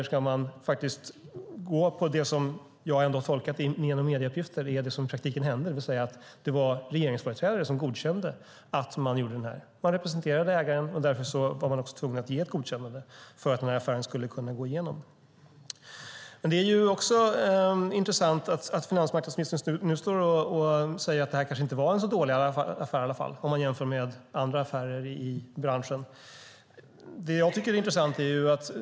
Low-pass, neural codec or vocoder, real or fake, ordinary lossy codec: none; none; real; none